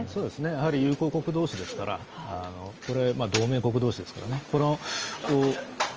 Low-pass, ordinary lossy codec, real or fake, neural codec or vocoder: 7.2 kHz; Opus, 24 kbps; real; none